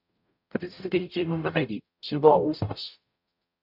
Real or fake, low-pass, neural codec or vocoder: fake; 5.4 kHz; codec, 44.1 kHz, 0.9 kbps, DAC